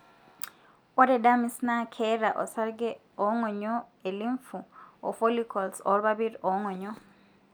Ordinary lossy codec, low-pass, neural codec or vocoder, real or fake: none; none; none; real